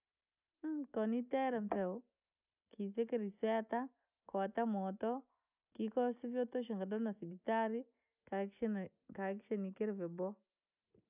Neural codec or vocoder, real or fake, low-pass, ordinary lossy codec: none; real; 3.6 kHz; none